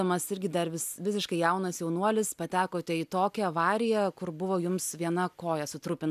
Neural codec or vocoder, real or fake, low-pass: none; real; 14.4 kHz